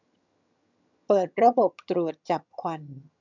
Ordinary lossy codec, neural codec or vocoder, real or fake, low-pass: none; vocoder, 22.05 kHz, 80 mel bands, HiFi-GAN; fake; 7.2 kHz